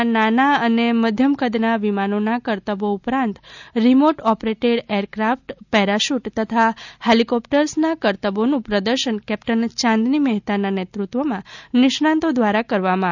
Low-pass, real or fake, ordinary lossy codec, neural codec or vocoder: 7.2 kHz; real; none; none